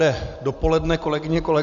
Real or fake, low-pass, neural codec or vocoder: real; 7.2 kHz; none